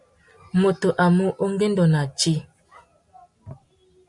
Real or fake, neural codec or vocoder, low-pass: real; none; 10.8 kHz